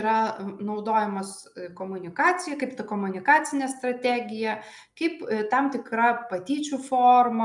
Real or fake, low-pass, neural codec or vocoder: real; 10.8 kHz; none